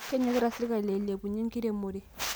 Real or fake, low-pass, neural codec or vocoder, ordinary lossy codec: real; none; none; none